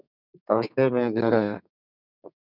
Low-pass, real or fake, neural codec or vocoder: 5.4 kHz; fake; codec, 32 kHz, 1.9 kbps, SNAC